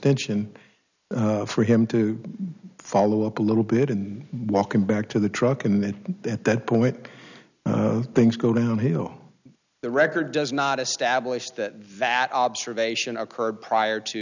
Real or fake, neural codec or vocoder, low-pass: real; none; 7.2 kHz